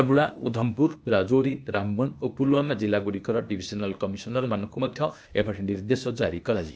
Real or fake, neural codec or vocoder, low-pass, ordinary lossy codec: fake; codec, 16 kHz, 0.8 kbps, ZipCodec; none; none